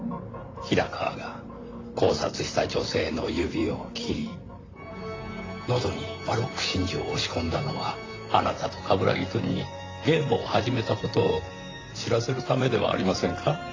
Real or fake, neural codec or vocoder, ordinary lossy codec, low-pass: fake; vocoder, 44.1 kHz, 128 mel bands, Pupu-Vocoder; AAC, 32 kbps; 7.2 kHz